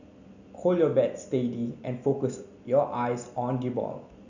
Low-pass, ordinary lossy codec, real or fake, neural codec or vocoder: 7.2 kHz; none; real; none